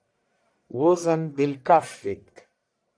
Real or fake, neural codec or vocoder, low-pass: fake; codec, 44.1 kHz, 1.7 kbps, Pupu-Codec; 9.9 kHz